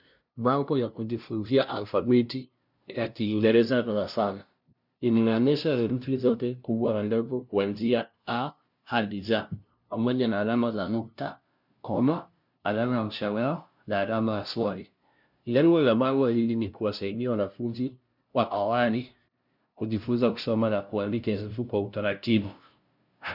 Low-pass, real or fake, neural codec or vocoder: 5.4 kHz; fake; codec, 16 kHz, 0.5 kbps, FunCodec, trained on LibriTTS, 25 frames a second